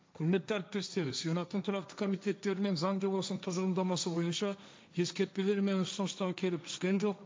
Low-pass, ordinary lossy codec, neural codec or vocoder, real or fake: none; none; codec, 16 kHz, 1.1 kbps, Voila-Tokenizer; fake